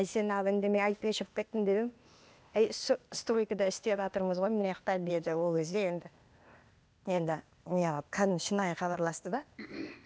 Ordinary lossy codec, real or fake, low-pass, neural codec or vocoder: none; fake; none; codec, 16 kHz, 0.8 kbps, ZipCodec